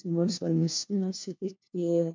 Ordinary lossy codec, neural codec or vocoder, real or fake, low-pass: MP3, 64 kbps; codec, 16 kHz, 1 kbps, FunCodec, trained on LibriTTS, 50 frames a second; fake; 7.2 kHz